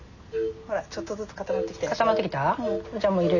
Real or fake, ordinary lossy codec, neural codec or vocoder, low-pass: real; none; none; 7.2 kHz